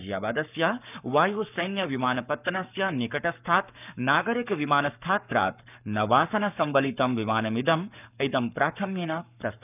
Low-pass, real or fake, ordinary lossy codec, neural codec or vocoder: 3.6 kHz; fake; none; codec, 44.1 kHz, 7.8 kbps, Pupu-Codec